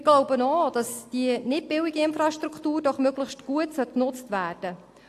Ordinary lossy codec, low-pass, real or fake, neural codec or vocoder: AAC, 64 kbps; 14.4 kHz; real; none